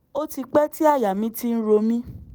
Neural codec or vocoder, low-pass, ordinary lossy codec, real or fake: none; none; none; real